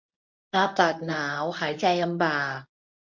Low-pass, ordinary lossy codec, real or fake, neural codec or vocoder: 7.2 kHz; MP3, 48 kbps; fake; codec, 24 kHz, 0.9 kbps, WavTokenizer, medium speech release version 1